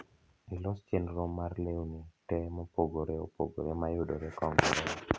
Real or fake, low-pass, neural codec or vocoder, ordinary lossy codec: real; none; none; none